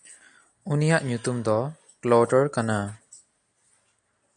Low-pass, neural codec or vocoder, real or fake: 9.9 kHz; none; real